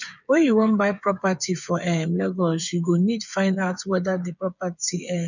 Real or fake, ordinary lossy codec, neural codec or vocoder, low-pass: fake; none; codec, 16 kHz, 8 kbps, FreqCodec, smaller model; 7.2 kHz